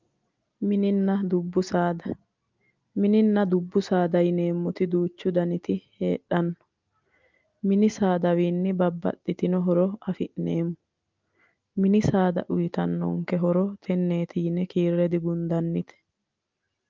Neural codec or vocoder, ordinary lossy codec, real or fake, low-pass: none; Opus, 24 kbps; real; 7.2 kHz